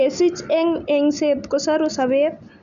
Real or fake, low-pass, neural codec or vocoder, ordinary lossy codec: real; 7.2 kHz; none; none